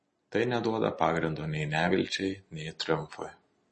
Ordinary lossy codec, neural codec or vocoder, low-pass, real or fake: MP3, 32 kbps; none; 10.8 kHz; real